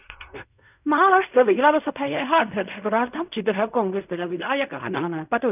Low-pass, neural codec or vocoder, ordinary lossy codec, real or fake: 3.6 kHz; codec, 16 kHz in and 24 kHz out, 0.4 kbps, LongCat-Audio-Codec, fine tuned four codebook decoder; none; fake